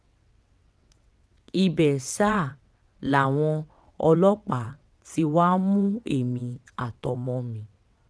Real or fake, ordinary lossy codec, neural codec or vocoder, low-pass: fake; none; vocoder, 22.05 kHz, 80 mel bands, WaveNeXt; none